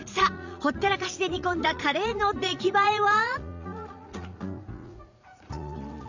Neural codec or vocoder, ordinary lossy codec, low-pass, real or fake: vocoder, 44.1 kHz, 80 mel bands, Vocos; none; 7.2 kHz; fake